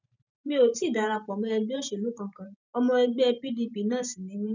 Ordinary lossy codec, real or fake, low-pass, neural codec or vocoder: none; fake; 7.2 kHz; vocoder, 44.1 kHz, 128 mel bands every 512 samples, BigVGAN v2